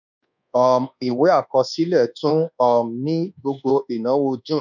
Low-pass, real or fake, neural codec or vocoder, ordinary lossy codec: 7.2 kHz; fake; codec, 24 kHz, 1.2 kbps, DualCodec; none